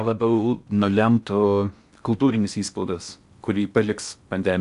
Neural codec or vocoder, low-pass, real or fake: codec, 16 kHz in and 24 kHz out, 0.6 kbps, FocalCodec, streaming, 4096 codes; 10.8 kHz; fake